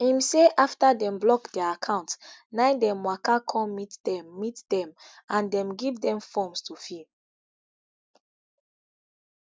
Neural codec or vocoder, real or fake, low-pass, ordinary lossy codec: none; real; none; none